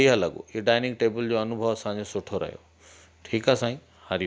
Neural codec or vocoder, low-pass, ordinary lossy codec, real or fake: none; none; none; real